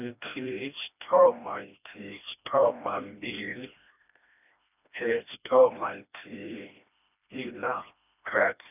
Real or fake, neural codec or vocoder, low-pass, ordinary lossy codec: fake; codec, 16 kHz, 1 kbps, FreqCodec, smaller model; 3.6 kHz; none